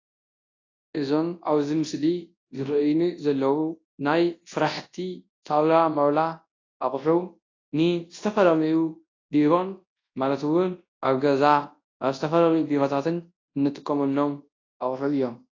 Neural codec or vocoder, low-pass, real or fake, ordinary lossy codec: codec, 24 kHz, 0.9 kbps, WavTokenizer, large speech release; 7.2 kHz; fake; AAC, 32 kbps